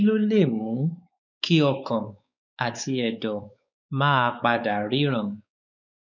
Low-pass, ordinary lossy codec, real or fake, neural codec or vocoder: 7.2 kHz; none; fake; codec, 16 kHz, 4 kbps, X-Codec, WavLM features, trained on Multilingual LibriSpeech